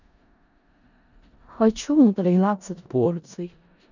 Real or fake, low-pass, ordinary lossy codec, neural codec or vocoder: fake; 7.2 kHz; none; codec, 16 kHz in and 24 kHz out, 0.4 kbps, LongCat-Audio-Codec, four codebook decoder